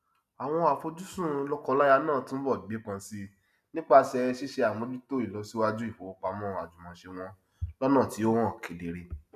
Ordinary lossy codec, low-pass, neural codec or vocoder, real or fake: none; 14.4 kHz; none; real